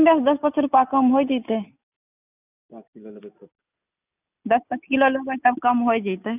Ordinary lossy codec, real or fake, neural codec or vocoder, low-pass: none; real; none; 3.6 kHz